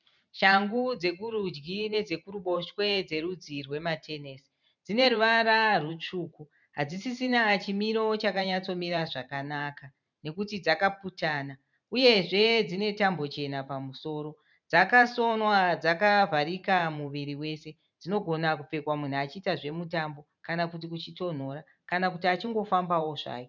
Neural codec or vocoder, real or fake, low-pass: vocoder, 44.1 kHz, 128 mel bands every 512 samples, BigVGAN v2; fake; 7.2 kHz